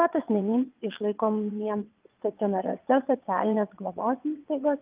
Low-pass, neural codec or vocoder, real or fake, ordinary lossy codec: 3.6 kHz; vocoder, 22.05 kHz, 80 mel bands, WaveNeXt; fake; Opus, 32 kbps